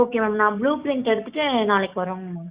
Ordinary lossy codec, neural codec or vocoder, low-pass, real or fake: Opus, 64 kbps; codec, 16 kHz, 6 kbps, DAC; 3.6 kHz; fake